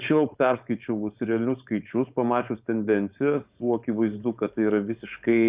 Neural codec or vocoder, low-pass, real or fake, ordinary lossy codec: codec, 16 kHz, 4.8 kbps, FACodec; 3.6 kHz; fake; Opus, 64 kbps